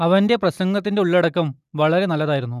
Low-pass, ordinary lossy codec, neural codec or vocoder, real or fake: 14.4 kHz; none; none; real